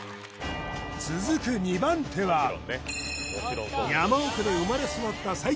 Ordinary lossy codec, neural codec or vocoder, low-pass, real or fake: none; none; none; real